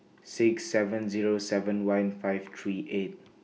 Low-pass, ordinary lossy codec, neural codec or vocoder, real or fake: none; none; none; real